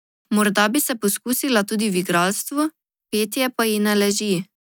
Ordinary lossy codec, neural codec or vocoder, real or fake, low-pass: none; none; real; none